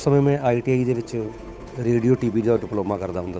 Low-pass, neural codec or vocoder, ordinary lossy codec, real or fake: none; codec, 16 kHz, 8 kbps, FunCodec, trained on Chinese and English, 25 frames a second; none; fake